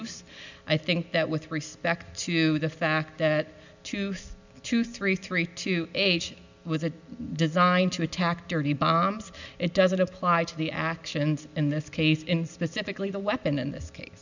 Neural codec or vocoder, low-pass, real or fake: none; 7.2 kHz; real